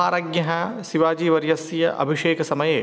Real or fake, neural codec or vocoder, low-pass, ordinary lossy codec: real; none; none; none